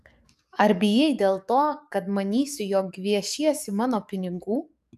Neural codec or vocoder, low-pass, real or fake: codec, 44.1 kHz, 7.8 kbps, DAC; 14.4 kHz; fake